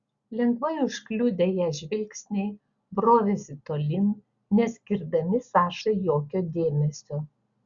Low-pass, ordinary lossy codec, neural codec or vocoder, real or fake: 7.2 kHz; Opus, 64 kbps; none; real